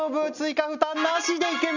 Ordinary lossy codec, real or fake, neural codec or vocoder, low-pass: none; real; none; 7.2 kHz